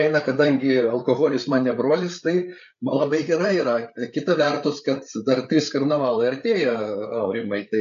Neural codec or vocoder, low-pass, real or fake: codec, 16 kHz, 8 kbps, FreqCodec, larger model; 7.2 kHz; fake